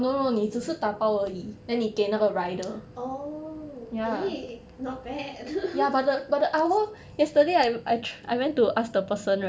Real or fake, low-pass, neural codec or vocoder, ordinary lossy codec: real; none; none; none